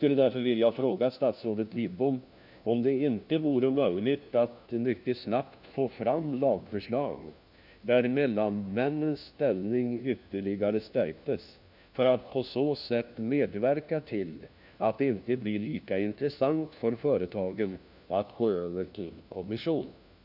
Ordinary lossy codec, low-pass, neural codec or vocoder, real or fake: none; 5.4 kHz; codec, 16 kHz, 1 kbps, FunCodec, trained on LibriTTS, 50 frames a second; fake